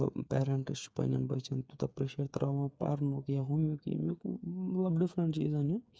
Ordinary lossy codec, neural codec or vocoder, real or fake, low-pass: none; codec, 16 kHz, 8 kbps, FreqCodec, smaller model; fake; none